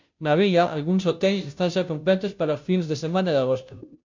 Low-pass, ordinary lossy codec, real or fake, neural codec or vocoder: 7.2 kHz; MP3, 64 kbps; fake; codec, 16 kHz, 0.5 kbps, FunCodec, trained on Chinese and English, 25 frames a second